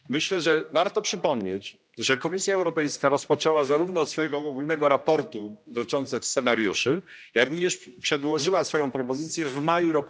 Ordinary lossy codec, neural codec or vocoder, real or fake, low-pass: none; codec, 16 kHz, 1 kbps, X-Codec, HuBERT features, trained on general audio; fake; none